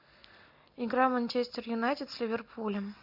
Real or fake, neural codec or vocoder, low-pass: real; none; 5.4 kHz